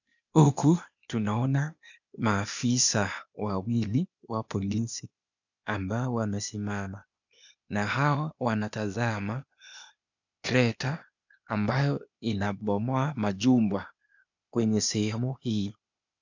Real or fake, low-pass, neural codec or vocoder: fake; 7.2 kHz; codec, 16 kHz, 0.8 kbps, ZipCodec